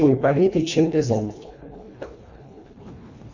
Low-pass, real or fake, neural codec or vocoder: 7.2 kHz; fake; codec, 24 kHz, 1.5 kbps, HILCodec